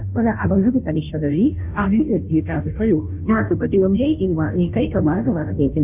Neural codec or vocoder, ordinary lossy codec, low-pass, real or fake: codec, 16 kHz, 0.5 kbps, FunCodec, trained on Chinese and English, 25 frames a second; none; 3.6 kHz; fake